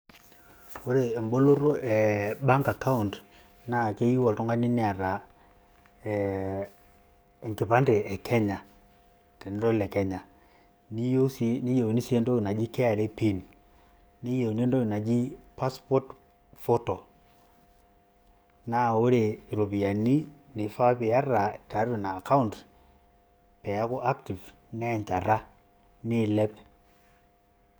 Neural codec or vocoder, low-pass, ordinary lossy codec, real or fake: codec, 44.1 kHz, 7.8 kbps, DAC; none; none; fake